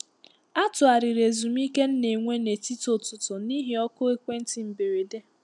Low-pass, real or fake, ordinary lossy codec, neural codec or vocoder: 10.8 kHz; real; none; none